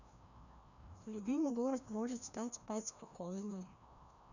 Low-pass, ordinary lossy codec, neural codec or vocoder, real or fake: 7.2 kHz; none; codec, 16 kHz, 1 kbps, FreqCodec, larger model; fake